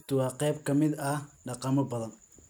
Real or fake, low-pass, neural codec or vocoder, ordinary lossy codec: real; none; none; none